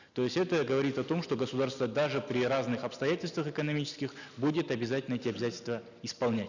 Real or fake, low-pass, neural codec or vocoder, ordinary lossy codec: real; 7.2 kHz; none; none